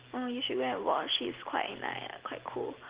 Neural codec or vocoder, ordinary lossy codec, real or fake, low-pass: none; Opus, 16 kbps; real; 3.6 kHz